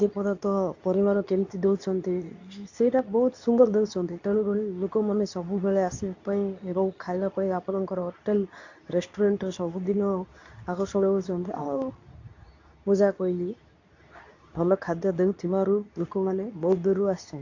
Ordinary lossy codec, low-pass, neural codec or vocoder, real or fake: AAC, 48 kbps; 7.2 kHz; codec, 24 kHz, 0.9 kbps, WavTokenizer, medium speech release version 2; fake